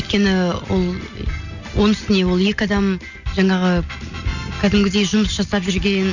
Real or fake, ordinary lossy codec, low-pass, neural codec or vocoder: real; none; 7.2 kHz; none